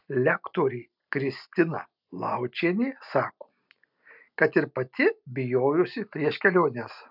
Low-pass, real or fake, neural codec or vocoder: 5.4 kHz; real; none